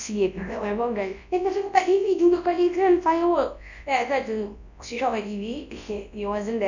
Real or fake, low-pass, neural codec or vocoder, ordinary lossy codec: fake; 7.2 kHz; codec, 24 kHz, 0.9 kbps, WavTokenizer, large speech release; none